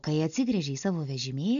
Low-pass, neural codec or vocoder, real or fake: 7.2 kHz; none; real